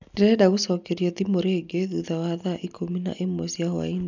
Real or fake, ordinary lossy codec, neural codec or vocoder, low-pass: real; none; none; 7.2 kHz